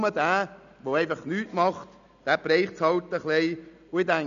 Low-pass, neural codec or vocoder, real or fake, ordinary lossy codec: 7.2 kHz; none; real; none